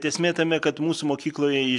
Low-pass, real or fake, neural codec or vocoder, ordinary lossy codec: 10.8 kHz; real; none; AAC, 64 kbps